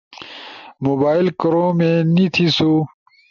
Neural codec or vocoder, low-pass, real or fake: none; 7.2 kHz; real